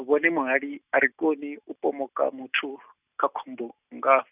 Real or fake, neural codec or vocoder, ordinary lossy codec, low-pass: real; none; none; 3.6 kHz